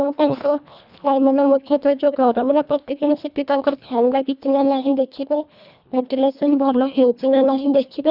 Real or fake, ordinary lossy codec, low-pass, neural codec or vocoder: fake; none; 5.4 kHz; codec, 24 kHz, 1.5 kbps, HILCodec